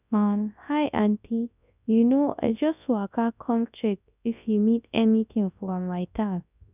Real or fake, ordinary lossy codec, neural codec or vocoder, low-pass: fake; none; codec, 24 kHz, 0.9 kbps, WavTokenizer, large speech release; 3.6 kHz